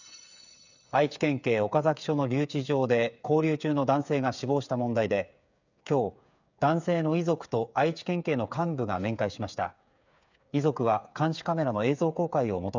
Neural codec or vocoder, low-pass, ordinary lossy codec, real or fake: codec, 16 kHz, 8 kbps, FreqCodec, smaller model; 7.2 kHz; none; fake